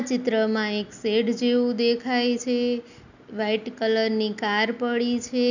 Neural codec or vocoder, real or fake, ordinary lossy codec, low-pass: none; real; none; 7.2 kHz